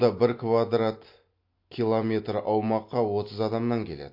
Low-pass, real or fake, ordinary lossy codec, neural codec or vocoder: 5.4 kHz; real; MP3, 32 kbps; none